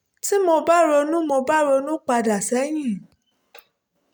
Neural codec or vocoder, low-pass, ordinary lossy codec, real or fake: none; none; none; real